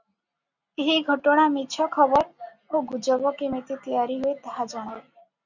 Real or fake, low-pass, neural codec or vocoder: real; 7.2 kHz; none